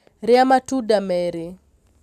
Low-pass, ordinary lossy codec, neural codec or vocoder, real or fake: 14.4 kHz; none; none; real